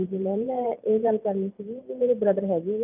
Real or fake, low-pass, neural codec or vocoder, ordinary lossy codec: fake; 3.6 kHz; vocoder, 44.1 kHz, 128 mel bands every 512 samples, BigVGAN v2; none